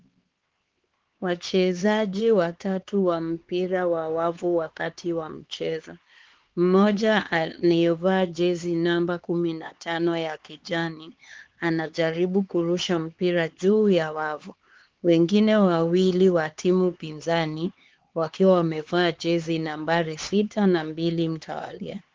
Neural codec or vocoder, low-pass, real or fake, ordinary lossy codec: codec, 16 kHz, 4 kbps, X-Codec, HuBERT features, trained on LibriSpeech; 7.2 kHz; fake; Opus, 16 kbps